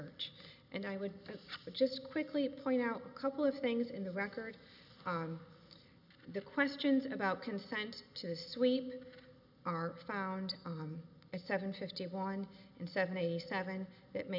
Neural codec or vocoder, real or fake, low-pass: none; real; 5.4 kHz